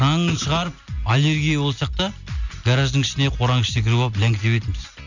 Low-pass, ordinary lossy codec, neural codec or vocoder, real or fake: 7.2 kHz; none; none; real